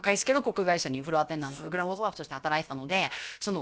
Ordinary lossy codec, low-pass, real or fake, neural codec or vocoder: none; none; fake; codec, 16 kHz, about 1 kbps, DyCAST, with the encoder's durations